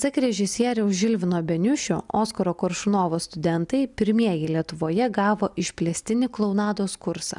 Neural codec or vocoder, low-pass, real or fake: none; 10.8 kHz; real